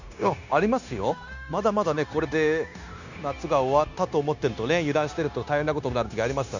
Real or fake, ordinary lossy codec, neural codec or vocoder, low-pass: fake; none; codec, 16 kHz, 0.9 kbps, LongCat-Audio-Codec; 7.2 kHz